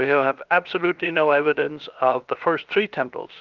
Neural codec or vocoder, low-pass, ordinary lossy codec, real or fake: codec, 16 kHz, about 1 kbps, DyCAST, with the encoder's durations; 7.2 kHz; Opus, 24 kbps; fake